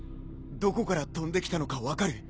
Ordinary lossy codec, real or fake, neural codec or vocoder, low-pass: none; real; none; none